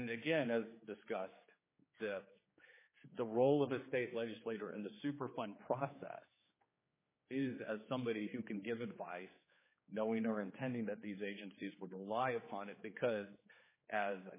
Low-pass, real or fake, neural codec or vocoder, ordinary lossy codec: 3.6 kHz; fake; codec, 16 kHz, 4 kbps, X-Codec, HuBERT features, trained on general audio; MP3, 16 kbps